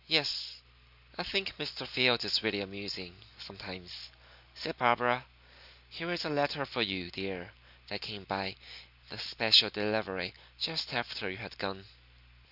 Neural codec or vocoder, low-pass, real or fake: none; 5.4 kHz; real